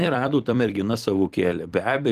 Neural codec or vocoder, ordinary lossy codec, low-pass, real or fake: vocoder, 44.1 kHz, 128 mel bands every 256 samples, BigVGAN v2; Opus, 24 kbps; 14.4 kHz; fake